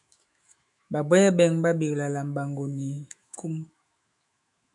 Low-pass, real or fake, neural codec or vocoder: 10.8 kHz; fake; autoencoder, 48 kHz, 128 numbers a frame, DAC-VAE, trained on Japanese speech